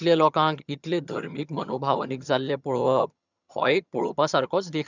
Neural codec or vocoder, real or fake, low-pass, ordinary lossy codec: vocoder, 22.05 kHz, 80 mel bands, HiFi-GAN; fake; 7.2 kHz; none